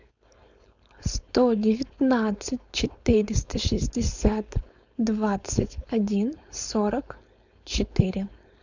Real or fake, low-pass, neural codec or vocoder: fake; 7.2 kHz; codec, 16 kHz, 4.8 kbps, FACodec